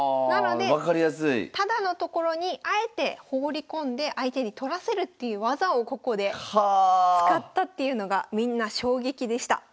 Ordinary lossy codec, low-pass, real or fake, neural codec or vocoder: none; none; real; none